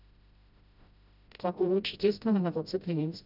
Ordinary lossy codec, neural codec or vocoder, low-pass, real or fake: none; codec, 16 kHz, 0.5 kbps, FreqCodec, smaller model; 5.4 kHz; fake